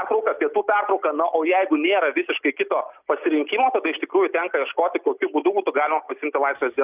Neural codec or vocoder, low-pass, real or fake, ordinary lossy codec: none; 3.6 kHz; real; Opus, 64 kbps